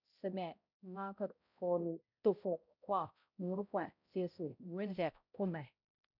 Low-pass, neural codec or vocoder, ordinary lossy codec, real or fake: 5.4 kHz; codec, 16 kHz, 0.5 kbps, X-Codec, HuBERT features, trained on balanced general audio; none; fake